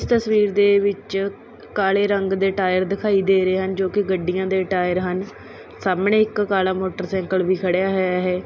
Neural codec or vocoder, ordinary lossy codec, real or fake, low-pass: none; none; real; none